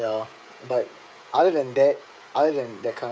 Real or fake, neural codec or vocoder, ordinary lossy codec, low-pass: fake; codec, 16 kHz, 16 kbps, FreqCodec, smaller model; none; none